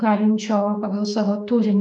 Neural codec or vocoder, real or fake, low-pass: autoencoder, 48 kHz, 32 numbers a frame, DAC-VAE, trained on Japanese speech; fake; 9.9 kHz